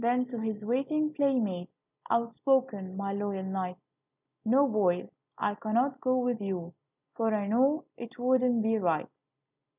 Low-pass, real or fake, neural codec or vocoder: 3.6 kHz; real; none